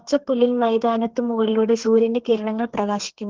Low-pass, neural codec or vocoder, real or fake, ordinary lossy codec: 7.2 kHz; codec, 32 kHz, 1.9 kbps, SNAC; fake; Opus, 16 kbps